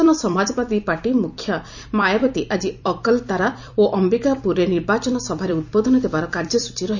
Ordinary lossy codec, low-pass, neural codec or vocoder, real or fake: none; 7.2 kHz; vocoder, 44.1 kHz, 128 mel bands every 256 samples, BigVGAN v2; fake